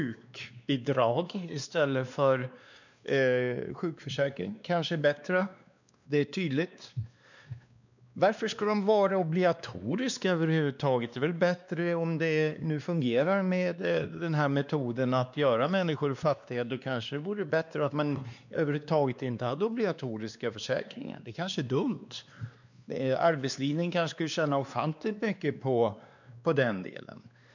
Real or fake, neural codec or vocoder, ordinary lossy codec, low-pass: fake; codec, 16 kHz, 2 kbps, X-Codec, WavLM features, trained on Multilingual LibriSpeech; none; 7.2 kHz